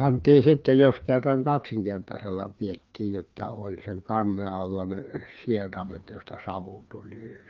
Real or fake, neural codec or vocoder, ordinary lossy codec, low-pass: fake; codec, 16 kHz, 2 kbps, FreqCodec, larger model; Opus, 24 kbps; 7.2 kHz